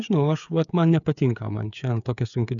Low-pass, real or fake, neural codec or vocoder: 7.2 kHz; fake; codec, 16 kHz, 8 kbps, FreqCodec, smaller model